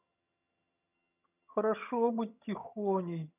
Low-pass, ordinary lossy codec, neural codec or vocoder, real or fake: 3.6 kHz; none; vocoder, 22.05 kHz, 80 mel bands, HiFi-GAN; fake